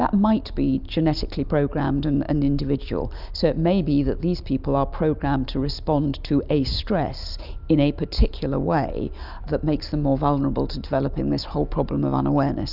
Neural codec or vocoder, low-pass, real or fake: none; 5.4 kHz; real